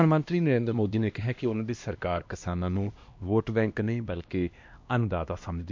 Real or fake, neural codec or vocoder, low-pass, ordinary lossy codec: fake; codec, 16 kHz, 1 kbps, X-Codec, HuBERT features, trained on LibriSpeech; 7.2 kHz; MP3, 48 kbps